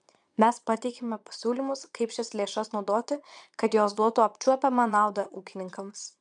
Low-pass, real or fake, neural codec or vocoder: 9.9 kHz; fake; vocoder, 22.05 kHz, 80 mel bands, Vocos